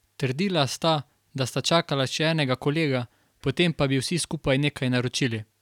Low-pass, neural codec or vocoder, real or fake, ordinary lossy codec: 19.8 kHz; none; real; none